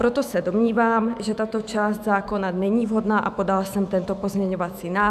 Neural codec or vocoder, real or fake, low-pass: autoencoder, 48 kHz, 128 numbers a frame, DAC-VAE, trained on Japanese speech; fake; 14.4 kHz